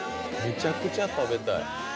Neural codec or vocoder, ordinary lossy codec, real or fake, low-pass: none; none; real; none